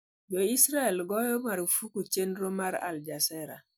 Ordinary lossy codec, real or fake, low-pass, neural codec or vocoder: none; real; none; none